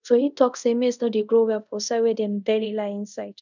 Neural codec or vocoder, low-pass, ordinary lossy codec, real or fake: codec, 24 kHz, 0.5 kbps, DualCodec; 7.2 kHz; none; fake